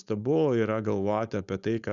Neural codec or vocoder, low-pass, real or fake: codec, 16 kHz, 4.8 kbps, FACodec; 7.2 kHz; fake